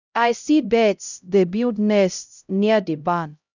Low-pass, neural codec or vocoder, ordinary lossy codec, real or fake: 7.2 kHz; codec, 16 kHz, 0.5 kbps, X-Codec, HuBERT features, trained on LibriSpeech; none; fake